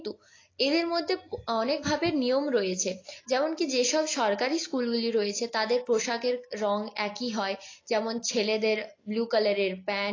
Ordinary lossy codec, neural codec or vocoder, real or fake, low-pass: AAC, 32 kbps; none; real; 7.2 kHz